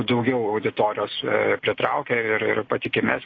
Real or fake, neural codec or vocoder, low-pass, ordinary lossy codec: real; none; 7.2 kHz; AAC, 32 kbps